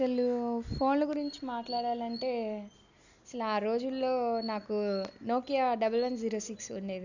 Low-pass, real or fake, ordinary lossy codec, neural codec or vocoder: 7.2 kHz; real; none; none